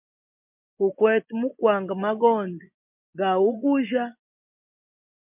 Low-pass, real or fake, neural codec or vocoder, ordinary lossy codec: 3.6 kHz; fake; vocoder, 24 kHz, 100 mel bands, Vocos; MP3, 32 kbps